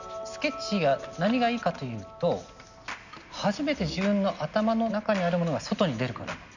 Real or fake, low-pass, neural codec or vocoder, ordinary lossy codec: real; 7.2 kHz; none; none